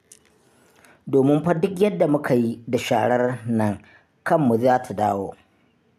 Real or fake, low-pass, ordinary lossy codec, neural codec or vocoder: real; 14.4 kHz; none; none